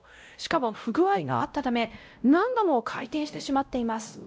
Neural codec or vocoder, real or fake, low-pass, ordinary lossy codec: codec, 16 kHz, 0.5 kbps, X-Codec, WavLM features, trained on Multilingual LibriSpeech; fake; none; none